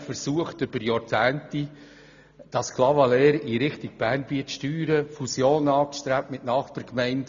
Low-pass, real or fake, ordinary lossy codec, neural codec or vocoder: 7.2 kHz; real; none; none